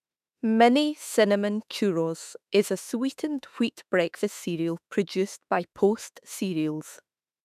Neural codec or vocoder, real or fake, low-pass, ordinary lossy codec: autoencoder, 48 kHz, 32 numbers a frame, DAC-VAE, trained on Japanese speech; fake; 14.4 kHz; none